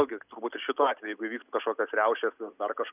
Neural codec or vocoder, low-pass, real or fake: none; 3.6 kHz; real